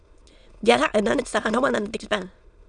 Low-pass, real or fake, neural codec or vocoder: 9.9 kHz; fake; autoencoder, 22.05 kHz, a latent of 192 numbers a frame, VITS, trained on many speakers